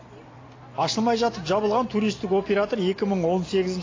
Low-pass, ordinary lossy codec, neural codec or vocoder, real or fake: 7.2 kHz; AAC, 32 kbps; none; real